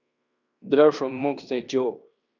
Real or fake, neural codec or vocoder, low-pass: fake; codec, 16 kHz in and 24 kHz out, 0.9 kbps, LongCat-Audio-Codec, fine tuned four codebook decoder; 7.2 kHz